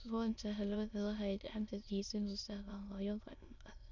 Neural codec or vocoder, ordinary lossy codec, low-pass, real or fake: autoencoder, 22.05 kHz, a latent of 192 numbers a frame, VITS, trained on many speakers; none; 7.2 kHz; fake